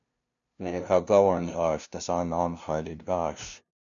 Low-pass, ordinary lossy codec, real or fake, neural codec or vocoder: 7.2 kHz; MP3, 96 kbps; fake; codec, 16 kHz, 0.5 kbps, FunCodec, trained on LibriTTS, 25 frames a second